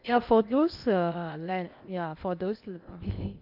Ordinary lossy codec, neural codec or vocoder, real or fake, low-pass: Opus, 64 kbps; codec, 16 kHz in and 24 kHz out, 0.8 kbps, FocalCodec, streaming, 65536 codes; fake; 5.4 kHz